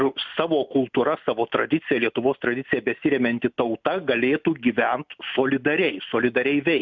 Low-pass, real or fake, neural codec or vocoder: 7.2 kHz; real; none